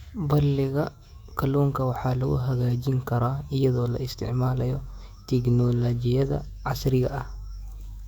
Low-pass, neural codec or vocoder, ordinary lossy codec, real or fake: 19.8 kHz; autoencoder, 48 kHz, 128 numbers a frame, DAC-VAE, trained on Japanese speech; Opus, 64 kbps; fake